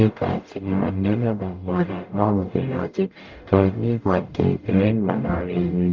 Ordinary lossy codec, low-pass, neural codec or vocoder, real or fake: Opus, 24 kbps; 7.2 kHz; codec, 44.1 kHz, 0.9 kbps, DAC; fake